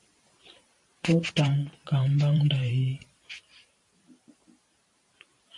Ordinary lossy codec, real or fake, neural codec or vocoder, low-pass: MP3, 48 kbps; fake; vocoder, 44.1 kHz, 128 mel bands every 512 samples, BigVGAN v2; 10.8 kHz